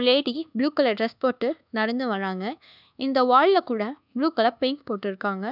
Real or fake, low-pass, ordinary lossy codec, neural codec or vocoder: fake; 5.4 kHz; none; codec, 24 kHz, 1.2 kbps, DualCodec